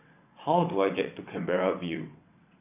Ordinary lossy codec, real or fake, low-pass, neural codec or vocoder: none; fake; 3.6 kHz; vocoder, 22.05 kHz, 80 mel bands, WaveNeXt